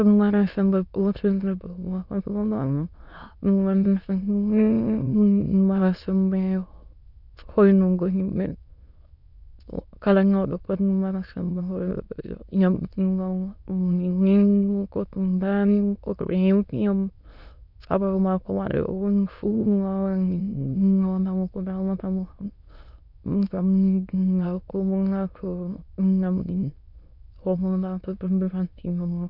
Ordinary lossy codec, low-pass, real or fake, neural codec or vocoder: none; 5.4 kHz; fake; autoencoder, 22.05 kHz, a latent of 192 numbers a frame, VITS, trained on many speakers